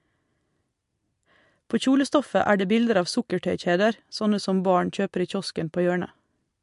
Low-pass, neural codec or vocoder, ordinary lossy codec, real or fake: 10.8 kHz; none; MP3, 64 kbps; real